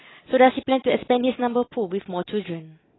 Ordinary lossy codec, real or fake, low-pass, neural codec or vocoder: AAC, 16 kbps; real; 7.2 kHz; none